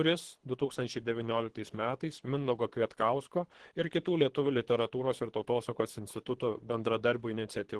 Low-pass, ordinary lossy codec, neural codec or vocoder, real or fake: 10.8 kHz; Opus, 16 kbps; codec, 24 kHz, 3 kbps, HILCodec; fake